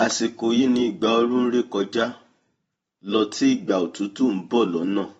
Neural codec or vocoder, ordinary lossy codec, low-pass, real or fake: vocoder, 44.1 kHz, 128 mel bands every 512 samples, BigVGAN v2; AAC, 24 kbps; 19.8 kHz; fake